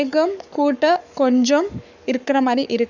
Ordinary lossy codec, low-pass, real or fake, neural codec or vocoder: none; 7.2 kHz; fake; codec, 44.1 kHz, 7.8 kbps, Pupu-Codec